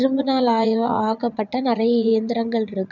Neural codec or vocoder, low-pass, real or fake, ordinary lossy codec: vocoder, 44.1 kHz, 80 mel bands, Vocos; 7.2 kHz; fake; none